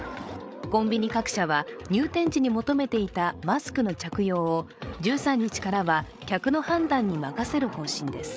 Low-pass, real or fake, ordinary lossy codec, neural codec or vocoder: none; fake; none; codec, 16 kHz, 8 kbps, FreqCodec, larger model